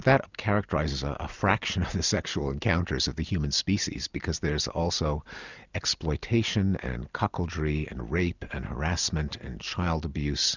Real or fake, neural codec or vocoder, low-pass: real; none; 7.2 kHz